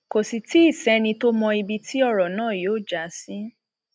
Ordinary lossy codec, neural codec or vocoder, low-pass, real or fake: none; none; none; real